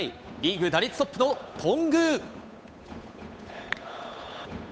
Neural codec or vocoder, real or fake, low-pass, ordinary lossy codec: codec, 16 kHz, 8 kbps, FunCodec, trained on Chinese and English, 25 frames a second; fake; none; none